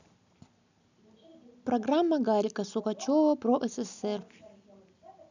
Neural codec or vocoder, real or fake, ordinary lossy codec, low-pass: none; real; none; 7.2 kHz